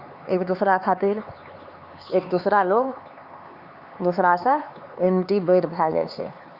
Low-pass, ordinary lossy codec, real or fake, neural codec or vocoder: 5.4 kHz; Opus, 64 kbps; fake; codec, 16 kHz, 4 kbps, X-Codec, HuBERT features, trained on LibriSpeech